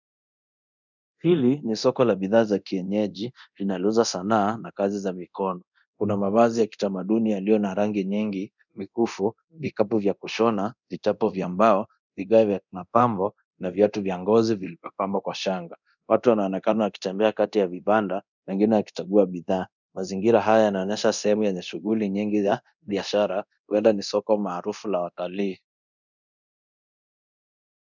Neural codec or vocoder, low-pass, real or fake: codec, 24 kHz, 0.9 kbps, DualCodec; 7.2 kHz; fake